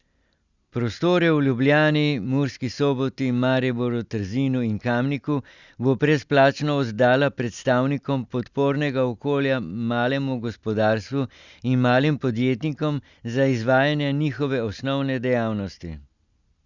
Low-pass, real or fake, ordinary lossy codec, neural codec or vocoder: 7.2 kHz; real; Opus, 64 kbps; none